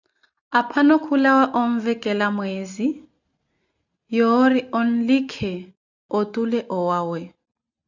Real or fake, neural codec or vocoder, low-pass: real; none; 7.2 kHz